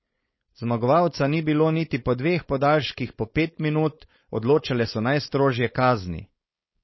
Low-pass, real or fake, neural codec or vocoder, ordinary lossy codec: 7.2 kHz; fake; codec, 16 kHz, 4.8 kbps, FACodec; MP3, 24 kbps